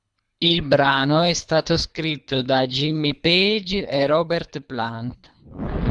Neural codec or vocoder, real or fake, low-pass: codec, 24 kHz, 3 kbps, HILCodec; fake; 10.8 kHz